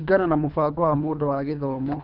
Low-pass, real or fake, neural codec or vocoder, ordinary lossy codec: 5.4 kHz; fake; codec, 24 kHz, 3 kbps, HILCodec; none